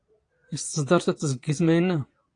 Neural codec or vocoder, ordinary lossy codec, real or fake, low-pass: vocoder, 22.05 kHz, 80 mel bands, Vocos; AAC, 48 kbps; fake; 9.9 kHz